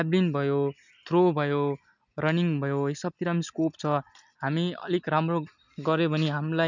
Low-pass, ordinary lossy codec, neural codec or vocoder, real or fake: 7.2 kHz; none; none; real